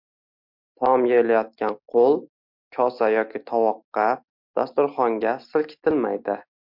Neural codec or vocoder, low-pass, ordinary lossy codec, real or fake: none; 5.4 kHz; MP3, 48 kbps; real